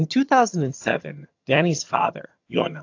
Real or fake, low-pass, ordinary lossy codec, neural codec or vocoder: fake; 7.2 kHz; AAC, 48 kbps; vocoder, 22.05 kHz, 80 mel bands, HiFi-GAN